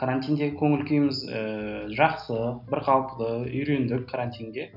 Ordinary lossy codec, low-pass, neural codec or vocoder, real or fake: none; 5.4 kHz; none; real